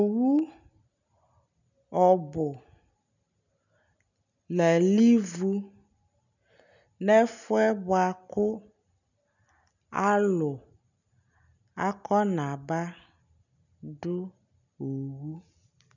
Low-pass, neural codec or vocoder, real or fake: 7.2 kHz; none; real